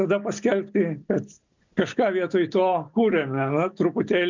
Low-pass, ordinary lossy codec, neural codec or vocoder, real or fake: 7.2 kHz; MP3, 64 kbps; none; real